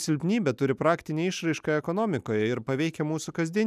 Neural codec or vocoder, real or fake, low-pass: none; real; 14.4 kHz